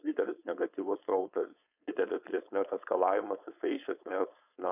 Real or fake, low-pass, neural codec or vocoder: fake; 3.6 kHz; codec, 16 kHz, 4.8 kbps, FACodec